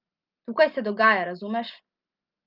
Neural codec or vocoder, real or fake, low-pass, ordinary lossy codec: none; real; 5.4 kHz; Opus, 32 kbps